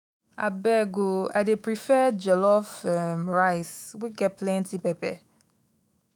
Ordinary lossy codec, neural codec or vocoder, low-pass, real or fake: none; autoencoder, 48 kHz, 128 numbers a frame, DAC-VAE, trained on Japanese speech; none; fake